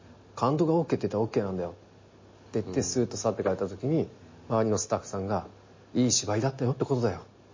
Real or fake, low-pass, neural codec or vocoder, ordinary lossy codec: real; 7.2 kHz; none; MP3, 32 kbps